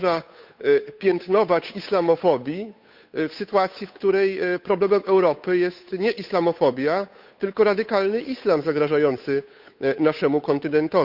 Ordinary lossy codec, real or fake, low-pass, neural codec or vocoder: none; fake; 5.4 kHz; codec, 16 kHz, 8 kbps, FunCodec, trained on Chinese and English, 25 frames a second